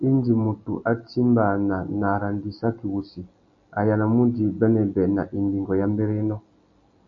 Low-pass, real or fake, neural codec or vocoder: 7.2 kHz; real; none